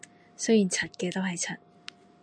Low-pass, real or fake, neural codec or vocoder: 9.9 kHz; real; none